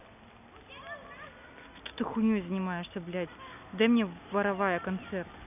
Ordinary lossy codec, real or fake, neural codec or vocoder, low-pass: none; real; none; 3.6 kHz